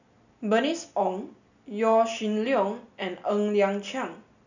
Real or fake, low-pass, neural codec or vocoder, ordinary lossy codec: real; 7.2 kHz; none; none